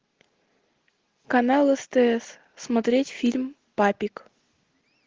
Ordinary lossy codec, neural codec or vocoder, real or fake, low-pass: Opus, 16 kbps; none; real; 7.2 kHz